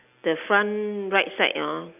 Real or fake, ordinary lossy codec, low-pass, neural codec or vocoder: real; AAC, 32 kbps; 3.6 kHz; none